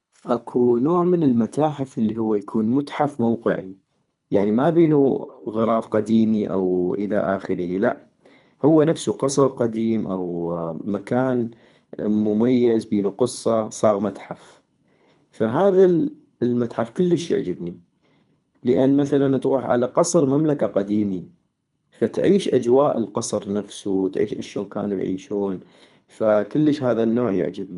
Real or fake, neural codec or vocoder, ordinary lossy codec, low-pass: fake; codec, 24 kHz, 3 kbps, HILCodec; MP3, 96 kbps; 10.8 kHz